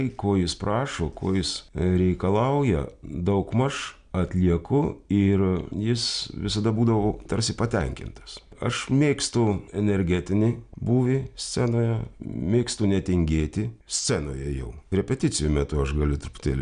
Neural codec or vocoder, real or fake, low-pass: none; real; 9.9 kHz